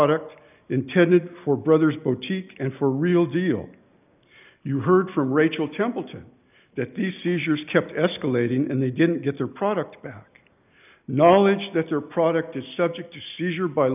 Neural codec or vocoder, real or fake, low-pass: none; real; 3.6 kHz